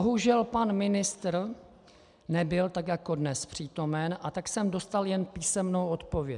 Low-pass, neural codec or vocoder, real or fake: 10.8 kHz; none; real